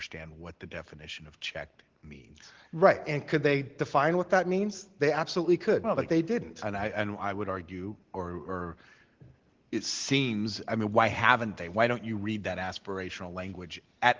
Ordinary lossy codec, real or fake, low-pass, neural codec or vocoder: Opus, 16 kbps; real; 7.2 kHz; none